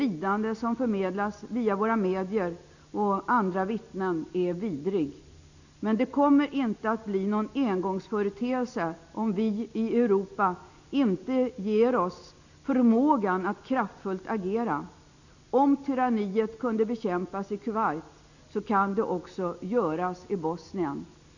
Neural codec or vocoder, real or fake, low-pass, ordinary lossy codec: none; real; 7.2 kHz; none